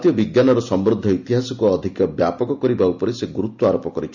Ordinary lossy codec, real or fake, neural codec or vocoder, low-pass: none; real; none; 7.2 kHz